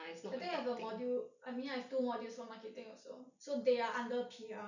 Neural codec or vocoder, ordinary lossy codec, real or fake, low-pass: none; none; real; 7.2 kHz